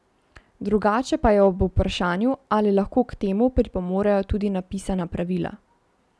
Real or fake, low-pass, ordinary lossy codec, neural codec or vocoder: real; none; none; none